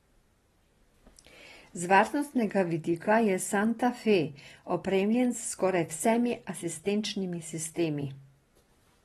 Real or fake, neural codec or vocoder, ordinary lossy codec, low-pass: real; none; AAC, 32 kbps; 19.8 kHz